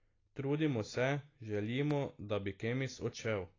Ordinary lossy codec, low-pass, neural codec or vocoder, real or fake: AAC, 32 kbps; 7.2 kHz; none; real